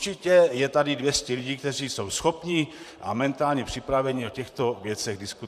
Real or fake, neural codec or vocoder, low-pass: fake; vocoder, 44.1 kHz, 128 mel bands, Pupu-Vocoder; 14.4 kHz